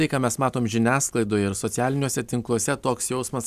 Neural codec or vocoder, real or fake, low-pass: none; real; 14.4 kHz